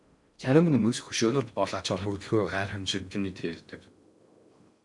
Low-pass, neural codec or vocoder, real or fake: 10.8 kHz; codec, 16 kHz in and 24 kHz out, 0.6 kbps, FocalCodec, streaming, 4096 codes; fake